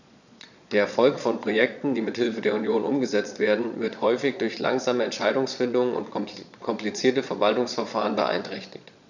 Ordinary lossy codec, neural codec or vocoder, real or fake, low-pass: none; vocoder, 22.05 kHz, 80 mel bands, Vocos; fake; 7.2 kHz